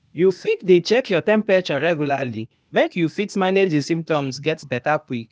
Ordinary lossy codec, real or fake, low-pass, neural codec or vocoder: none; fake; none; codec, 16 kHz, 0.8 kbps, ZipCodec